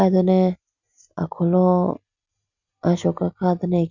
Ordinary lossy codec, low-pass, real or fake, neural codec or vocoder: none; 7.2 kHz; real; none